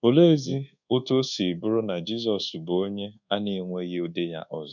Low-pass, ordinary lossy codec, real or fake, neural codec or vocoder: 7.2 kHz; none; fake; codec, 24 kHz, 1.2 kbps, DualCodec